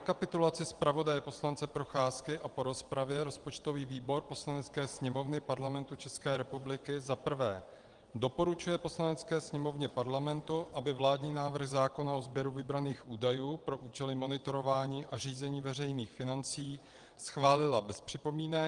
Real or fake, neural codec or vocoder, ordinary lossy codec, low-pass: fake; vocoder, 22.05 kHz, 80 mel bands, WaveNeXt; Opus, 24 kbps; 9.9 kHz